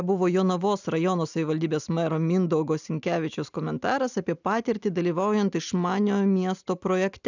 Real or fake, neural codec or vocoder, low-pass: real; none; 7.2 kHz